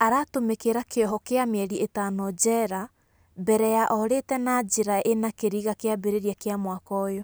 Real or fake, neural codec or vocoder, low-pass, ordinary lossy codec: real; none; none; none